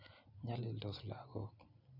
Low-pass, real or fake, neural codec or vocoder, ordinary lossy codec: 5.4 kHz; real; none; none